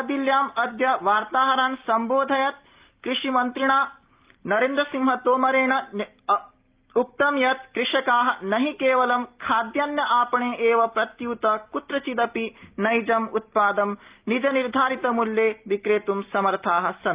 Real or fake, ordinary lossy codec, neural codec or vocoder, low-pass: real; Opus, 32 kbps; none; 3.6 kHz